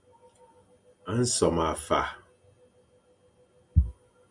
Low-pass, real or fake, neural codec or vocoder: 10.8 kHz; real; none